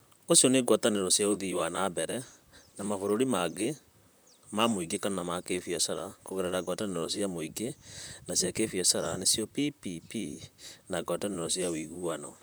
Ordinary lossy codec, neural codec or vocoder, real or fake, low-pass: none; vocoder, 44.1 kHz, 128 mel bands, Pupu-Vocoder; fake; none